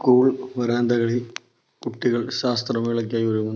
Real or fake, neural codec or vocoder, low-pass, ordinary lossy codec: real; none; none; none